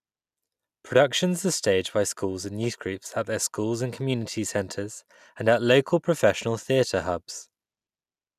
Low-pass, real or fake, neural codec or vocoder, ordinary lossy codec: 14.4 kHz; real; none; none